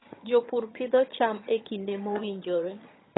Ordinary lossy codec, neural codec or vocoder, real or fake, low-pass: AAC, 16 kbps; vocoder, 22.05 kHz, 80 mel bands, HiFi-GAN; fake; 7.2 kHz